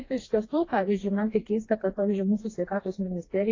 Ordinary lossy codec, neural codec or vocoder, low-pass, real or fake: AAC, 32 kbps; codec, 16 kHz, 2 kbps, FreqCodec, smaller model; 7.2 kHz; fake